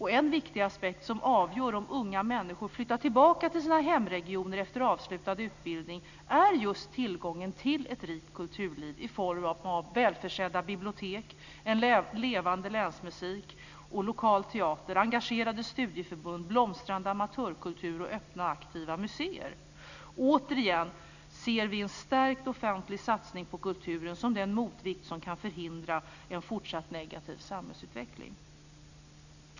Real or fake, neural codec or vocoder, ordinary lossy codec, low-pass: real; none; none; 7.2 kHz